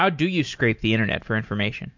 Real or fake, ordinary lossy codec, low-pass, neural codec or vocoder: real; MP3, 48 kbps; 7.2 kHz; none